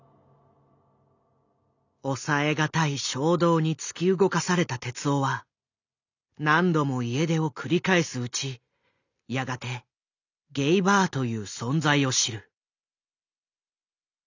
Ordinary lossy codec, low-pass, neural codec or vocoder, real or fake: none; 7.2 kHz; none; real